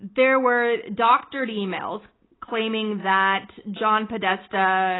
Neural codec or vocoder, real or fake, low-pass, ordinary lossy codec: none; real; 7.2 kHz; AAC, 16 kbps